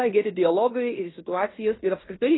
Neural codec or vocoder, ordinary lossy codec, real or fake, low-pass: codec, 16 kHz in and 24 kHz out, 0.9 kbps, LongCat-Audio-Codec, fine tuned four codebook decoder; AAC, 16 kbps; fake; 7.2 kHz